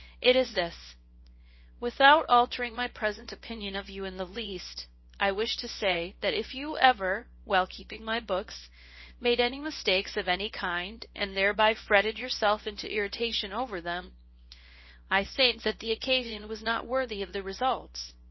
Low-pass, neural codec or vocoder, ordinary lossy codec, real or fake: 7.2 kHz; codec, 24 kHz, 0.9 kbps, WavTokenizer, small release; MP3, 24 kbps; fake